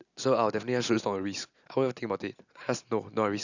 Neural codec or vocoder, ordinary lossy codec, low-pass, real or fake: none; none; 7.2 kHz; real